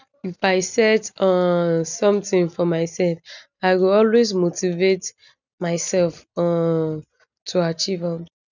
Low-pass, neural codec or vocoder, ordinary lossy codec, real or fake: 7.2 kHz; none; none; real